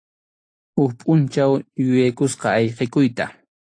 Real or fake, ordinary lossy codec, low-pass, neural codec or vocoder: real; AAC, 48 kbps; 9.9 kHz; none